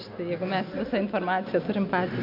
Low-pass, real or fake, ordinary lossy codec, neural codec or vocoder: 5.4 kHz; real; MP3, 48 kbps; none